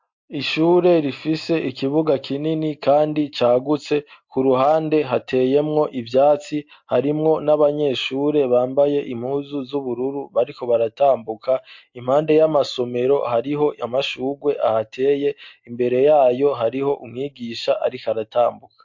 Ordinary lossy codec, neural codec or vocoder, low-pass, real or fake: MP3, 48 kbps; none; 7.2 kHz; real